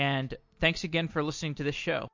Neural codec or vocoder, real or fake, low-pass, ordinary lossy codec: none; real; 7.2 kHz; MP3, 48 kbps